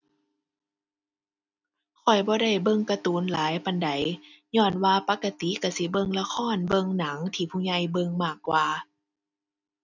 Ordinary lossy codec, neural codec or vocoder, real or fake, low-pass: none; none; real; 7.2 kHz